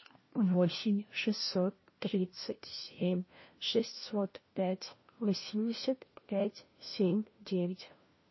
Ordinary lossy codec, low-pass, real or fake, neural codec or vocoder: MP3, 24 kbps; 7.2 kHz; fake; codec, 16 kHz, 1 kbps, FunCodec, trained on LibriTTS, 50 frames a second